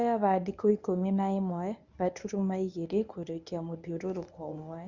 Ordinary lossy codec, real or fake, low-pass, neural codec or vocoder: none; fake; 7.2 kHz; codec, 24 kHz, 0.9 kbps, WavTokenizer, medium speech release version 1